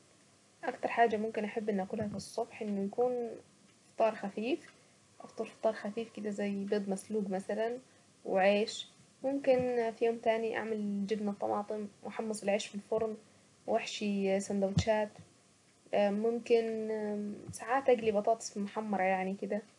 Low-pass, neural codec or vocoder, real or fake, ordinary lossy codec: 10.8 kHz; none; real; none